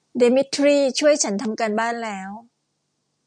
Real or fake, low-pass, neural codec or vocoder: real; 9.9 kHz; none